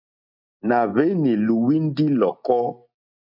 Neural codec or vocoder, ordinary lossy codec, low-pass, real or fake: none; MP3, 48 kbps; 5.4 kHz; real